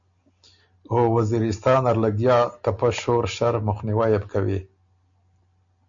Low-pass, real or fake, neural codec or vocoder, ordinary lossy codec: 7.2 kHz; real; none; MP3, 64 kbps